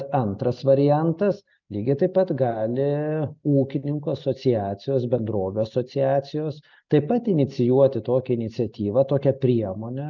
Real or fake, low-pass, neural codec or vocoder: real; 7.2 kHz; none